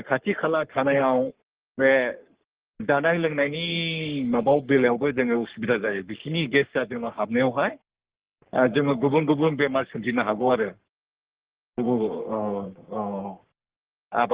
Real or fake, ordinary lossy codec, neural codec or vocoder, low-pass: fake; Opus, 16 kbps; codec, 44.1 kHz, 3.4 kbps, Pupu-Codec; 3.6 kHz